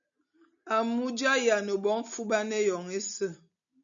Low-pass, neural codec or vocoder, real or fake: 7.2 kHz; none; real